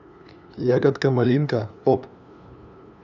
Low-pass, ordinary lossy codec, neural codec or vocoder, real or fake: 7.2 kHz; none; codec, 16 kHz, 2 kbps, FunCodec, trained on LibriTTS, 25 frames a second; fake